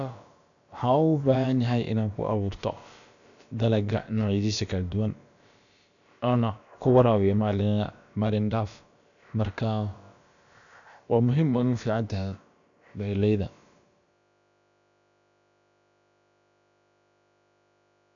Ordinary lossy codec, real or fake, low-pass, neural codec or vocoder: none; fake; 7.2 kHz; codec, 16 kHz, about 1 kbps, DyCAST, with the encoder's durations